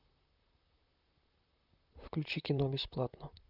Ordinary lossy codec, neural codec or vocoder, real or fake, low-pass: none; vocoder, 44.1 kHz, 128 mel bands, Pupu-Vocoder; fake; 5.4 kHz